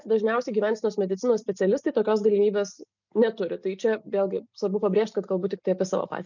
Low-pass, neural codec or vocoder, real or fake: 7.2 kHz; none; real